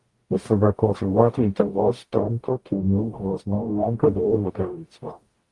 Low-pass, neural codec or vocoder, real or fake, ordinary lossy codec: 10.8 kHz; codec, 44.1 kHz, 0.9 kbps, DAC; fake; Opus, 24 kbps